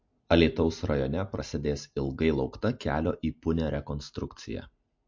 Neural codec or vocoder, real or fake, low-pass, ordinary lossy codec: none; real; 7.2 kHz; MP3, 48 kbps